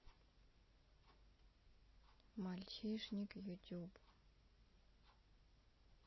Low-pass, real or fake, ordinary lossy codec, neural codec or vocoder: 7.2 kHz; real; MP3, 24 kbps; none